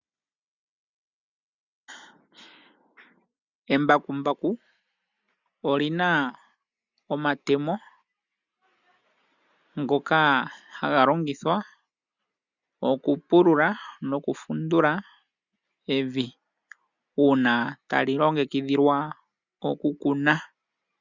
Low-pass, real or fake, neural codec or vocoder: 7.2 kHz; real; none